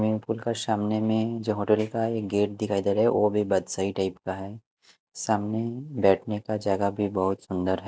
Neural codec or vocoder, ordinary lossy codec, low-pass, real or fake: none; none; none; real